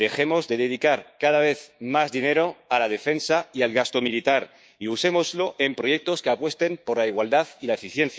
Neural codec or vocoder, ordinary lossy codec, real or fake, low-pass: codec, 16 kHz, 6 kbps, DAC; none; fake; none